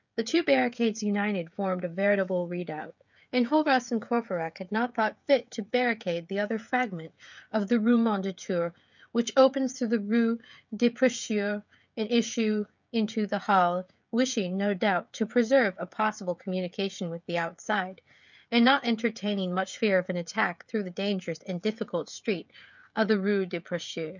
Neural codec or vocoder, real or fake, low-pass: codec, 16 kHz, 16 kbps, FreqCodec, smaller model; fake; 7.2 kHz